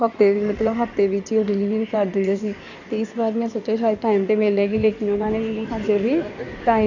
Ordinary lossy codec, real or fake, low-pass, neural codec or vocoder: none; fake; 7.2 kHz; codec, 16 kHz in and 24 kHz out, 2.2 kbps, FireRedTTS-2 codec